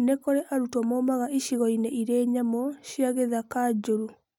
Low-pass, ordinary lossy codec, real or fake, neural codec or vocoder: 19.8 kHz; none; real; none